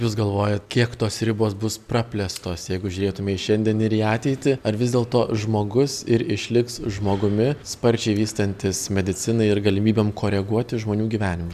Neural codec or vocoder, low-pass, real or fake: none; 14.4 kHz; real